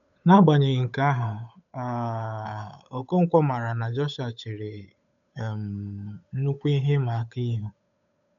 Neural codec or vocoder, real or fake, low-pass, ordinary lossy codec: codec, 16 kHz, 8 kbps, FunCodec, trained on Chinese and English, 25 frames a second; fake; 7.2 kHz; none